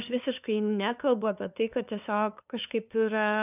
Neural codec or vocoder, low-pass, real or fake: codec, 16 kHz, 2 kbps, FunCodec, trained on LibriTTS, 25 frames a second; 3.6 kHz; fake